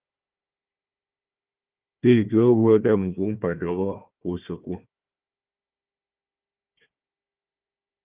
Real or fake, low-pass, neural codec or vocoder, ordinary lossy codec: fake; 3.6 kHz; codec, 16 kHz, 1 kbps, FunCodec, trained on Chinese and English, 50 frames a second; Opus, 32 kbps